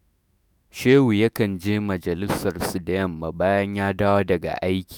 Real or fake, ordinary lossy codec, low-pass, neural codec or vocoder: fake; none; none; autoencoder, 48 kHz, 128 numbers a frame, DAC-VAE, trained on Japanese speech